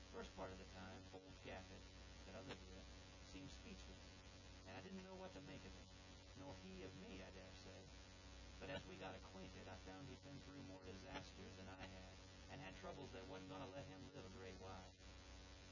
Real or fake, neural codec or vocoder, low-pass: fake; vocoder, 24 kHz, 100 mel bands, Vocos; 7.2 kHz